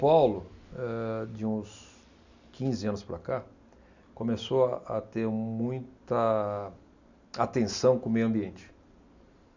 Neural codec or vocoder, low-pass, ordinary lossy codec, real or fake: none; 7.2 kHz; none; real